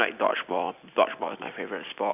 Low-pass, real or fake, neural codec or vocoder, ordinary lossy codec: 3.6 kHz; real; none; none